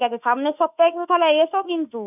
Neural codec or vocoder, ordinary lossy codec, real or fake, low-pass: autoencoder, 48 kHz, 32 numbers a frame, DAC-VAE, trained on Japanese speech; none; fake; 3.6 kHz